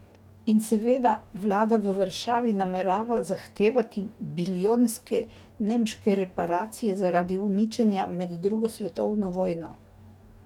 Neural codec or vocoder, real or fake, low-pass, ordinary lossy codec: codec, 44.1 kHz, 2.6 kbps, DAC; fake; 19.8 kHz; none